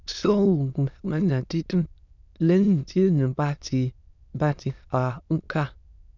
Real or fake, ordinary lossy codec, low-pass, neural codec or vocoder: fake; none; 7.2 kHz; autoencoder, 22.05 kHz, a latent of 192 numbers a frame, VITS, trained on many speakers